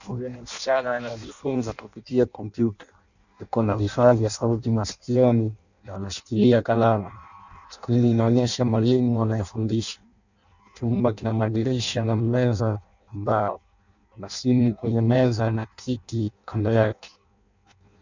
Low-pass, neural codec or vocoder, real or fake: 7.2 kHz; codec, 16 kHz in and 24 kHz out, 0.6 kbps, FireRedTTS-2 codec; fake